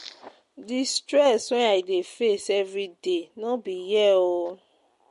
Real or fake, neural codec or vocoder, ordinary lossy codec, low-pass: real; none; MP3, 48 kbps; 14.4 kHz